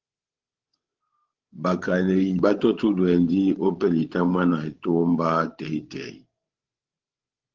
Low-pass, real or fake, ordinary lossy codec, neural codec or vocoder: 7.2 kHz; fake; Opus, 16 kbps; codec, 16 kHz, 16 kbps, FreqCodec, larger model